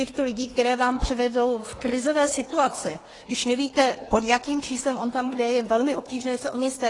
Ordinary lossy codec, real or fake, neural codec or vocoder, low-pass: AAC, 32 kbps; fake; codec, 24 kHz, 1 kbps, SNAC; 10.8 kHz